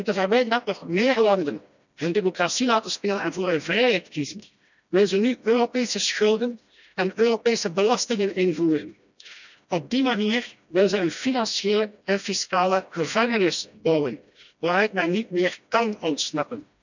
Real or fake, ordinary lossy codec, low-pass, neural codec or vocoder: fake; none; 7.2 kHz; codec, 16 kHz, 1 kbps, FreqCodec, smaller model